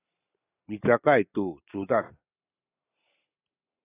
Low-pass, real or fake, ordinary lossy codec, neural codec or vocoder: 3.6 kHz; real; AAC, 16 kbps; none